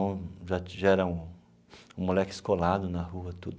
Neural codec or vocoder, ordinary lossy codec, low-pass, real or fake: none; none; none; real